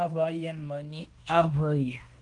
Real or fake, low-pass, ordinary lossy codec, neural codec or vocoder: fake; 10.8 kHz; Opus, 32 kbps; codec, 16 kHz in and 24 kHz out, 0.9 kbps, LongCat-Audio-Codec, fine tuned four codebook decoder